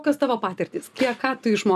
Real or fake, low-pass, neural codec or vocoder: real; 14.4 kHz; none